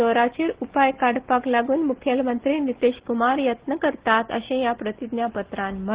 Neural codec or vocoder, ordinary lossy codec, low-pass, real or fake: none; Opus, 16 kbps; 3.6 kHz; real